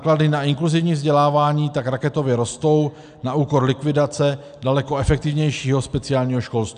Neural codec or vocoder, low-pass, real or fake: none; 9.9 kHz; real